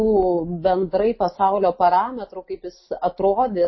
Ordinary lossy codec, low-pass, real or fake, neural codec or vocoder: MP3, 24 kbps; 7.2 kHz; real; none